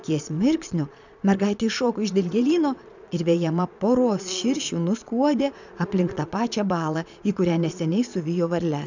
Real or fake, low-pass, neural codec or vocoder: fake; 7.2 kHz; vocoder, 22.05 kHz, 80 mel bands, Vocos